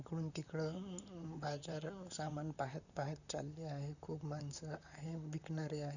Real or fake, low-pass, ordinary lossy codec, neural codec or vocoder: fake; 7.2 kHz; none; vocoder, 44.1 kHz, 128 mel bands, Pupu-Vocoder